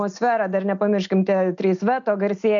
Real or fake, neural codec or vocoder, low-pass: real; none; 7.2 kHz